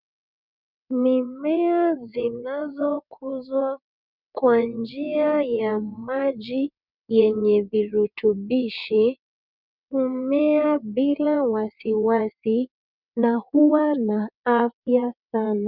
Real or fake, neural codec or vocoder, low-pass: fake; vocoder, 22.05 kHz, 80 mel bands, WaveNeXt; 5.4 kHz